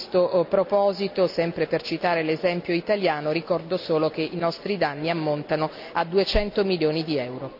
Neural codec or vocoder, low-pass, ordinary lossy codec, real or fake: none; 5.4 kHz; none; real